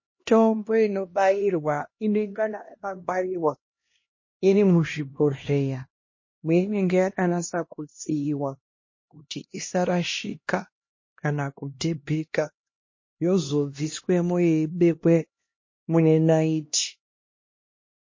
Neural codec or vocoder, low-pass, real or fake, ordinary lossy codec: codec, 16 kHz, 1 kbps, X-Codec, HuBERT features, trained on LibriSpeech; 7.2 kHz; fake; MP3, 32 kbps